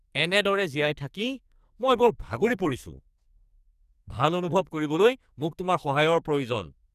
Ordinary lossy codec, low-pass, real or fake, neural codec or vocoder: none; 14.4 kHz; fake; codec, 44.1 kHz, 2.6 kbps, SNAC